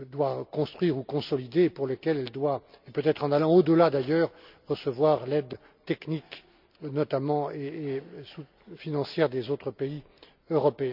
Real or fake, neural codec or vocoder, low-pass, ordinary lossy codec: real; none; 5.4 kHz; none